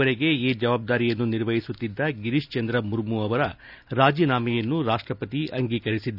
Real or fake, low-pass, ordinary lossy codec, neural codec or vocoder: real; 5.4 kHz; none; none